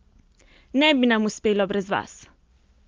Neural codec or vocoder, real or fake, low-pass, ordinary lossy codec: none; real; 7.2 kHz; Opus, 32 kbps